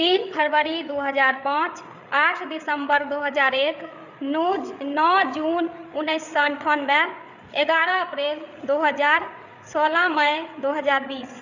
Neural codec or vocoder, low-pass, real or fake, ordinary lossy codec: codec, 16 kHz, 8 kbps, FreqCodec, larger model; 7.2 kHz; fake; none